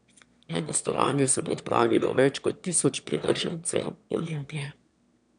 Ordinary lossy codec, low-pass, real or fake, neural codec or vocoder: none; 9.9 kHz; fake; autoencoder, 22.05 kHz, a latent of 192 numbers a frame, VITS, trained on one speaker